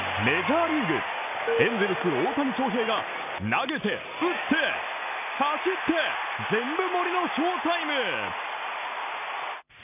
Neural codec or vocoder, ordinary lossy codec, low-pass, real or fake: none; none; 3.6 kHz; real